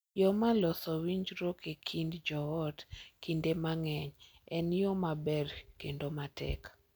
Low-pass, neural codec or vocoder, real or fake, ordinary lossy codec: none; none; real; none